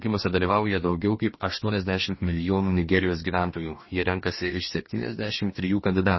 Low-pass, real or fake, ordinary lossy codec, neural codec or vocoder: 7.2 kHz; fake; MP3, 24 kbps; codec, 44.1 kHz, 2.6 kbps, DAC